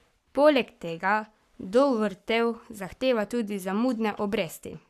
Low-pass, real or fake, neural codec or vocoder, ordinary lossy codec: 14.4 kHz; fake; codec, 44.1 kHz, 7.8 kbps, Pupu-Codec; none